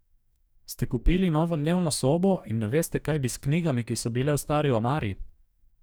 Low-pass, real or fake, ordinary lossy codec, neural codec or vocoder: none; fake; none; codec, 44.1 kHz, 2.6 kbps, DAC